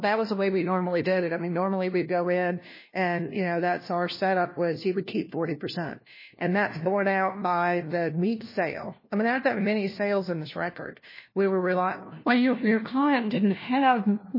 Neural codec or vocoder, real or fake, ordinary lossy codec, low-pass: codec, 16 kHz, 1 kbps, FunCodec, trained on LibriTTS, 50 frames a second; fake; MP3, 24 kbps; 5.4 kHz